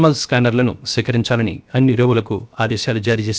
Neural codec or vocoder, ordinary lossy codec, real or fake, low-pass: codec, 16 kHz, 0.7 kbps, FocalCodec; none; fake; none